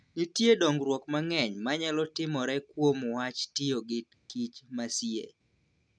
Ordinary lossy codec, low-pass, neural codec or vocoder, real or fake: none; 9.9 kHz; none; real